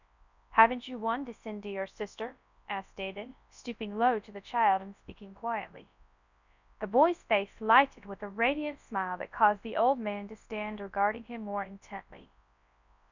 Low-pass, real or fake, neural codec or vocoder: 7.2 kHz; fake; codec, 24 kHz, 0.9 kbps, WavTokenizer, large speech release